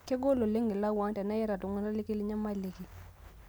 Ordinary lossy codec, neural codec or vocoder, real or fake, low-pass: none; none; real; none